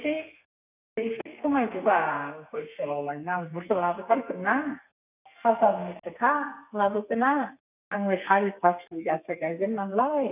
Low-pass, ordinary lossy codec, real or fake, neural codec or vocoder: 3.6 kHz; none; fake; codec, 32 kHz, 1.9 kbps, SNAC